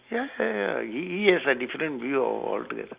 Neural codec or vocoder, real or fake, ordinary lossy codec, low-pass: none; real; Opus, 64 kbps; 3.6 kHz